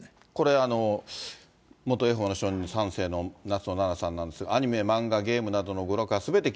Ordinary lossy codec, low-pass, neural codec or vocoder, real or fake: none; none; none; real